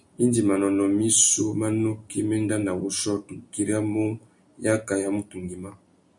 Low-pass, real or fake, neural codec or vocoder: 10.8 kHz; real; none